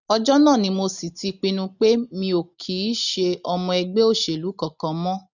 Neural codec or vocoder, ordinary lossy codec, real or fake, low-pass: none; none; real; 7.2 kHz